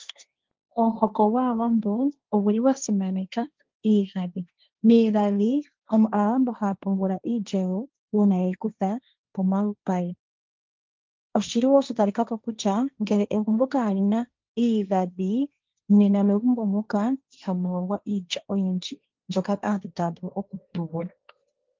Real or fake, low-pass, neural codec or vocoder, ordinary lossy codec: fake; 7.2 kHz; codec, 16 kHz, 1.1 kbps, Voila-Tokenizer; Opus, 24 kbps